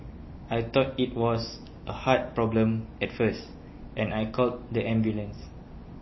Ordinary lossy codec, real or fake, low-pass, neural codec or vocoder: MP3, 24 kbps; real; 7.2 kHz; none